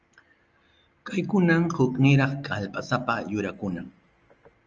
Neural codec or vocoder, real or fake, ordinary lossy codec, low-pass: none; real; Opus, 24 kbps; 7.2 kHz